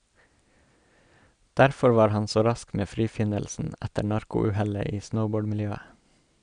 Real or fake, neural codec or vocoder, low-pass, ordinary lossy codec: real; none; 9.9 kHz; none